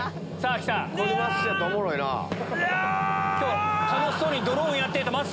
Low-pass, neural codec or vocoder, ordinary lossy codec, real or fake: none; none; none; real